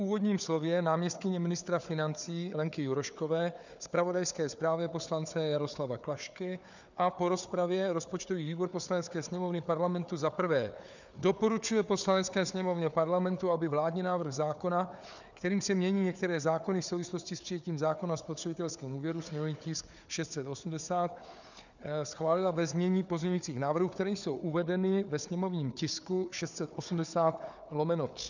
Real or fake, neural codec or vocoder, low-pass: fake; codec, 16 kHz, 4 kbps, FunCodec, trained on Chinese and English, 50 frames a second; 7.2 kHz